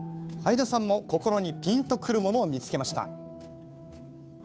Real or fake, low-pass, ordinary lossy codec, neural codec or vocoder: fake; none; none; codec, 16 kHz, 2 kbps, FunCodec, trained on Chinese and English, 25 frames a second